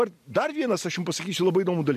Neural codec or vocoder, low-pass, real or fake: none; 14.4 kHz; real